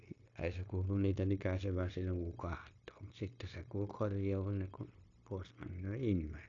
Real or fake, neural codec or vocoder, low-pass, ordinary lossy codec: fake; codec, 16 kHz, 0.9 kbps, LongCat-Audio-Codec; 7.2 kHz; AAC, 48 kbps